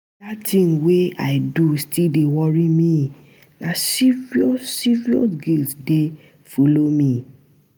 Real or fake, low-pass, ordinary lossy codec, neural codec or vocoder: real; none; none; none